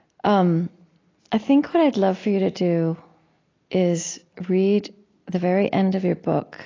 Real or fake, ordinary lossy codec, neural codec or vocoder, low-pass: real; AAC, 32 kbps; none; 7.2 kHz